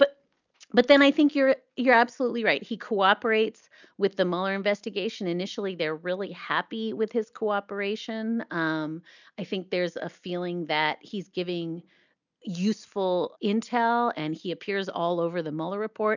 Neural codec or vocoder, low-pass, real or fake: none; 7.2 kHz; real